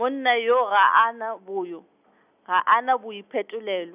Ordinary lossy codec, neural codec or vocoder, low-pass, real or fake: none; none; 3.6 kHz; real